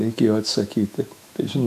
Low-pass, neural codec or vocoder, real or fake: 14.4 kHz; autoencoder, 48 kHz, 128 numbers a frame, DAC-VAE, trained on Japanese speech; fake